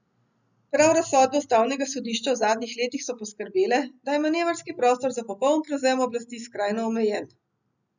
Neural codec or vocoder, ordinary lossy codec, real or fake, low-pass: none; none; real; 7.2 kHz